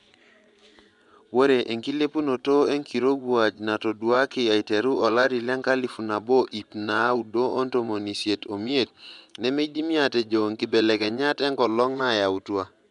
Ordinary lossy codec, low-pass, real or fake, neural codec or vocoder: none; 10.8 kHz; fake; vocoder, 24 kHz, 100 mel bands, Vocos